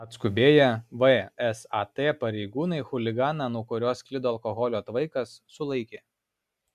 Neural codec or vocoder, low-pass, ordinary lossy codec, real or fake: none; 14.4 kHz; MP3, 96 kbps; real